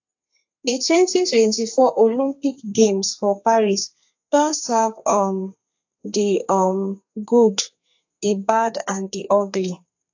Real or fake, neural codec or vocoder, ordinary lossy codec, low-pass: fake; codec, 32 kHz, 1.9 kbps, SNAC; AAC, 48 kbps; 7.2 kHz